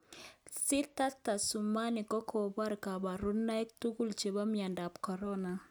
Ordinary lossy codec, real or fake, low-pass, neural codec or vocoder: none; real; none; none